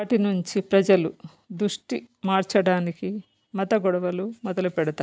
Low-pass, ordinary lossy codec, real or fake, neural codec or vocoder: none; none; real; none